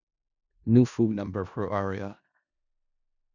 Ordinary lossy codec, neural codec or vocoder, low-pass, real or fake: none; codec, 16 kHz in and 24 kHz out, 0.4 kbps, LongCat-Audio-Codec, four codebook decoder; 7.2 kHz; fake